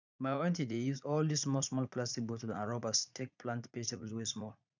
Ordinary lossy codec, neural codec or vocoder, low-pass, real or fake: none; vocoder, 44.1 kHz, 80 mel bands, Vocos; 7.2 kHz; fake